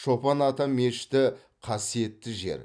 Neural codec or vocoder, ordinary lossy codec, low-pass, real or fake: none; none; 9.9 kHz; real